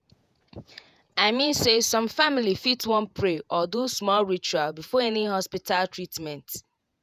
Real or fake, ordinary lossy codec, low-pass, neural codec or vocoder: fake; none; 14.4 kHz; vocoder, 48 kHz, 128 mel bands, Vocos